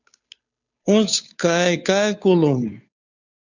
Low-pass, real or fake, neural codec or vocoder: 7.2 kHz; fake; codec, 16 kHz, 2 kbps, FunCodec, trained on Chinese and English, 25 frames a second